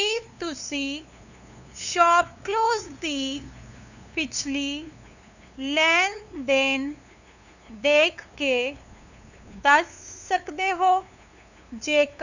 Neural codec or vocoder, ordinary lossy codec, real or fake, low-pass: codec, 16 kHz, 2 kbps, FunCodec, trained on LibriTTS, 25 frames a second; none; fake; 7.2 kHz